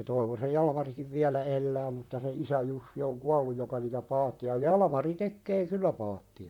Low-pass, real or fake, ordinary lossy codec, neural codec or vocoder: 19.8 kHz; fake; MP3, 96 kbps; vocoder, 44.1 kHz, 128 mel bands, Pupu-Vocoder